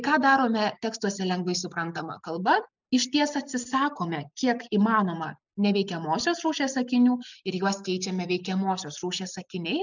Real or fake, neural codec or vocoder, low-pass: real; none; 7.2 kHz